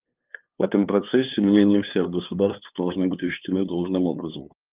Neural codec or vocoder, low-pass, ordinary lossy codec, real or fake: codec, 16 kHz, 2 kbps, FunCodec, trained on LibriTTS, 25 frames a second; 3.6 kHz; Opus, 24 kbps; fake